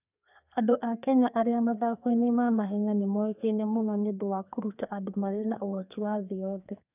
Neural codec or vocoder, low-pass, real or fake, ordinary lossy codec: codec, 32 kHz, 1.9 kbps, SNAC; 3.6 kHz; fake; none